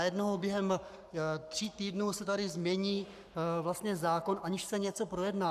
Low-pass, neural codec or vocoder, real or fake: 14.4 kHz; codec, 44.1 kHz, 7.8 kbps, Pupu-Codec; fake